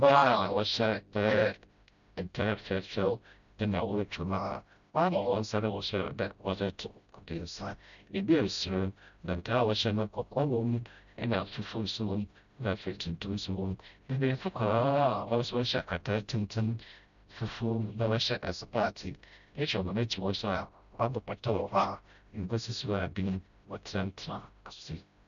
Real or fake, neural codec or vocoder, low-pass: fake; codec, 16 kHz, 0.5 kbps, FreqCodec, smaller model; 7.2 kHz